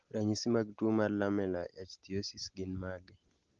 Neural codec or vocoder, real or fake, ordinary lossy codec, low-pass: none; real; Opus, 16 kbps; 7.2 kHz